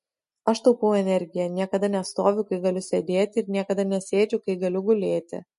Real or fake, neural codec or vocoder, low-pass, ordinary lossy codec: real; none; 14.4 kHz; MP3, 48 kbps